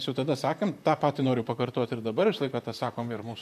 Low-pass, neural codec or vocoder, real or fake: 14.4 kHz; vocoder, 44.1 kHz, 128 mel bands, Pupu-Vocoder; fake